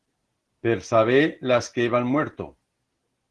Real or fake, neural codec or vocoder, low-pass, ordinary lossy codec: real; none; 10.8 kHz; Opus, 16 kbps